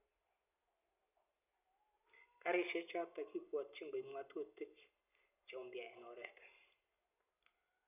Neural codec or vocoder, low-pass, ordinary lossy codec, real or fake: none; 3.6 kHz; none; real